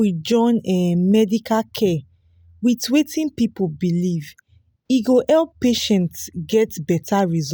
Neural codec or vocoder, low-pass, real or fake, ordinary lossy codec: none; none; real; none